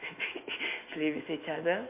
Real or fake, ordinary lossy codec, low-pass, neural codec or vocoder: fake; MP3, 24 kbps; 3.6 kHz; autoencoder, 48 kHz, 128 numbers a frame, DAC-VAE, trained on Japanese speech